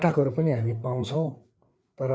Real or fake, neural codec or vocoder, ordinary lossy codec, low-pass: fake; codec, 16 kHz, 4 kbps, FreqCodec, larger model; none; none